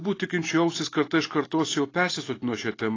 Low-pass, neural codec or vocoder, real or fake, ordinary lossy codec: 7.2 kHz; none; real; AAC, 32 kbps